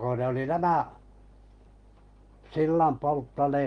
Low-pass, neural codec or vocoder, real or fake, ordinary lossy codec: 9.9 kHz; none; real; Opus, 24 kbps